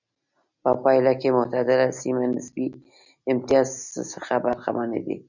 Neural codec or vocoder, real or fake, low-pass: none; real; 7.2 kHz